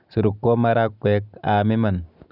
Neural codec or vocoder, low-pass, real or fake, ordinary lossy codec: none; 5.4 kHz; real; none